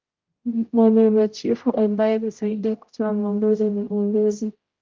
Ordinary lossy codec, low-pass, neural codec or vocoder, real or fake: Opus, 32 kbps; 7.2 kHz; codec, 16 kHz, 0.5 kbps, X-Codec, HuBERT features, trained on general audio; fake